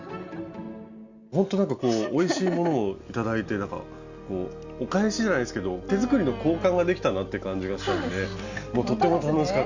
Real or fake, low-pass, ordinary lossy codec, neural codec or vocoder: real; 7.2 kHz; Opus, 64 kbps; none